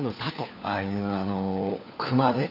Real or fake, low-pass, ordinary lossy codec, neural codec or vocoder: fake; 5.4 kHz; none; codec, 16 kHz, 4 kbps, FunCodec, trained on LibriTTS, 50 frames a second